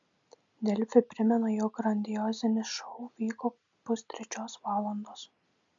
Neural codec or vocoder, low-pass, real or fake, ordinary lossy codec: none; 7.2 kHz; real; MP3, 64 kbps